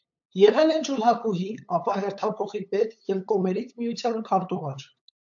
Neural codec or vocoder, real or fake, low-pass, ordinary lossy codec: codec, 16 kHz, 8 kbps, FunCodec, trained on LibriTTS, 25 frames a second; fake; 7.2 kHz; AAC, 64 kbps